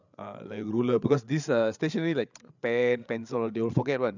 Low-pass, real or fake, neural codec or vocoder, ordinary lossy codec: 7.2 kHz; fake; codec, 16 kHz, 8 kbps, FreqCodec, larger model; none